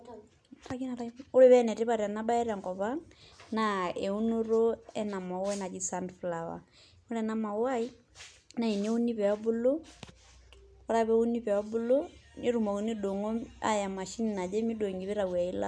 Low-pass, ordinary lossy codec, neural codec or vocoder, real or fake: none; none; none; real